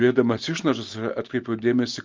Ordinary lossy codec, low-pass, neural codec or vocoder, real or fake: Opus, 32 kbps; 7.2 kHz; none; real